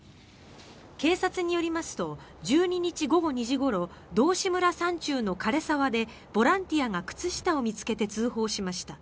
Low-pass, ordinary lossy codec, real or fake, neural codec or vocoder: none; none; real; none